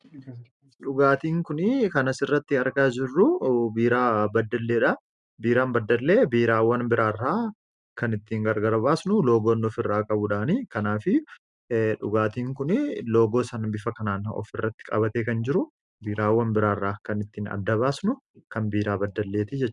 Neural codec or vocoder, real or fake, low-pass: none; real; 10.8 kHz